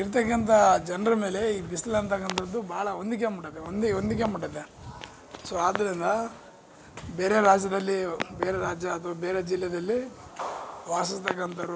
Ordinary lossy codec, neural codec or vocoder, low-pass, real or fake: none; none; none; real